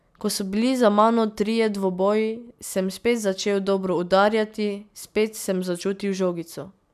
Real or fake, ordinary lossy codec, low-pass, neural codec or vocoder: real; none; 14.4 kHz; none